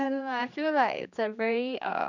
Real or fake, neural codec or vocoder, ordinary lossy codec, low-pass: fake; codec, 16 kHz, 2 kbps, X-Codec, HuBERT features, trained on general audio; none; 7.2 kHz